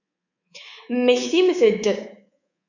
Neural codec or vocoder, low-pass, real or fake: codec, 24 kHz, 3.1 kbps, DualCodec; 7.2 kHz; fake